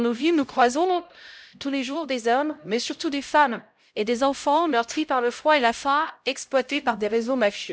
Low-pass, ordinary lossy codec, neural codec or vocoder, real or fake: none; none; codec, 16 kHz, 0.5 kbps, X-Codec, HuBERT features, trained on LibriSpeech; fake